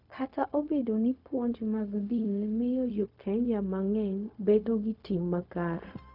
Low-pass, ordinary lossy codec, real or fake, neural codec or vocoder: 5.4 kHz; none; fake; codec, 16 kHz, 0.4 kbps, LongCat-Audio-Codec